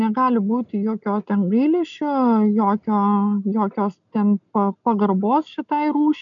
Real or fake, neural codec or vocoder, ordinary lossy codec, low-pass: real; none; MP3, 96 kbps; 7.2 kHz